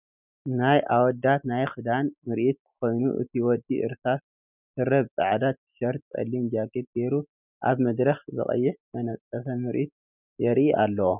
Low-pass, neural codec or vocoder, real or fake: 3.6 kHz; none; real